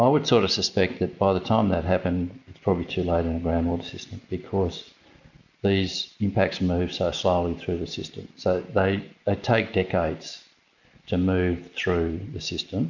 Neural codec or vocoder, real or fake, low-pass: none; real; 7.2 kHz